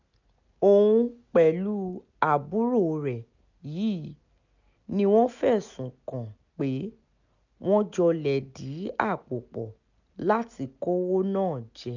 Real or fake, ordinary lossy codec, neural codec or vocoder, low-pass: real; none; none; 7.2 kHz